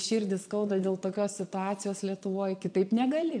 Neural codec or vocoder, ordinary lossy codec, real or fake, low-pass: vocoder, 22.05 kHz, 80 mel bands, Vocos; MP3, 96 kbps; fake; 9.9 kHz